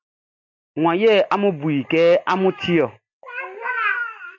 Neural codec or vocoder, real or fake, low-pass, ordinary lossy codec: none; real; 7.2 kHz; MP3, 64 kbps